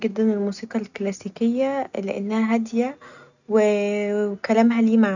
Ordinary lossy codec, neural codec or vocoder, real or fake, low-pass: none; none; real; 7.2 kHz